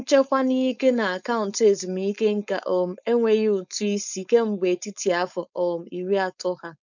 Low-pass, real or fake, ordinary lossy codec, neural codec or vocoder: 7.2 kHz; fake; none; codec, 16 kHz, 4.8 kbps, FACodec